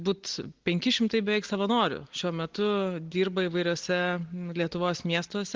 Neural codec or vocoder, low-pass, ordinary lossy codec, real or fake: none; 7.2 kHz; Opus, 16 kbps; real